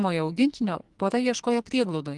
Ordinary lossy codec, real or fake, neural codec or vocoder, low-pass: Opus, 24 kbps; fake; codec, 44.1 kHz, 2.6 kbps, SNAC; 10.8 kHz